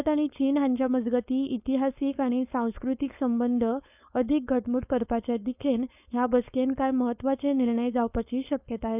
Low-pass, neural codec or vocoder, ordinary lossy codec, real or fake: 3.6 kHz; codec, 16 kHz, 4.8 kbps, FACodec; none; fake